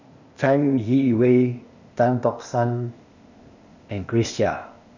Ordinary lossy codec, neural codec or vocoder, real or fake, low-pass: none; codec, 16 kHz, 0.8 kbps, ZipCodec; fake; 7.2 kHz